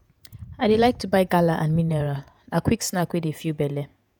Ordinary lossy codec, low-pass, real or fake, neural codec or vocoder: none; none; fake; vocoder, 48 kHz, 128 mel bands, Vocos